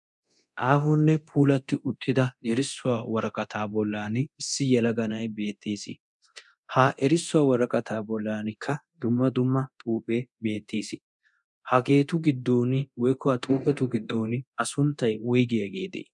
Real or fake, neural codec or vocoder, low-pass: fake; codec, 24 kHz, 0.9 kbps, DualCodec; 10.8 kHz